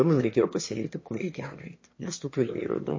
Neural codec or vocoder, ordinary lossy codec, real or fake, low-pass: autoencoder, 22.05 kHz, a latent of 192 numbers a frame, VITS, trained on one speaker; MP3, 32 kbps; fake; 7.2 kHz